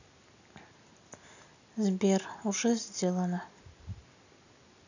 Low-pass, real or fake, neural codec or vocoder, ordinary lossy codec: 7.2 kHz; real; none; none